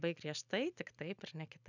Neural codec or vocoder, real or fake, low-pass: none; real; 7.2 kHz